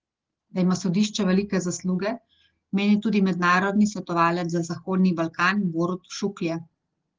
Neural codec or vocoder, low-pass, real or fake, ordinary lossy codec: none; 7.2 kHz; real; Opus, 16 kbps